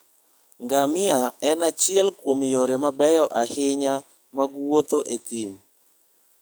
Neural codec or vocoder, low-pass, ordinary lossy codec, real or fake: codec, 44.1 kHz, 2.6 kbps, SNAC; none; none; fake